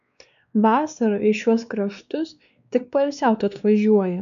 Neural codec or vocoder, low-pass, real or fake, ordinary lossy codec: codec, 16 kHz, 2 kbps, X-Codec, WavLM features, trained on Multilingual LibriSpeech; 7.2 kHz; fake; AAC, 96 kbps